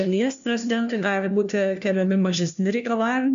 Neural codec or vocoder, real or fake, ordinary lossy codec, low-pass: codec, 16 kHz, 1 kbps, FunCodec, trained on LibriTTS, 50 frames a second; fake; MP3, 64 kbps; 7.2 kHz